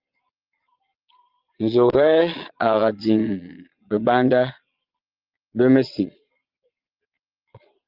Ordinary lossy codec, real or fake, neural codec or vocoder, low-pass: Opus, 24 kbps; fake; vocoder, 22.05 kHz, 80 mel bands, Vocos; 5.4 kHz